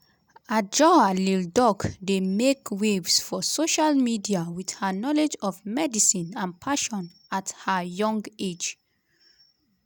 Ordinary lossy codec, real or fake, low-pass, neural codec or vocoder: none; real; none; none